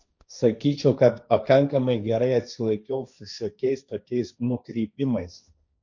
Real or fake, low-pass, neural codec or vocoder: fake; 7.2 kHz; codec, 16 kHz, 1.1 kbps, Voila-Tokenizer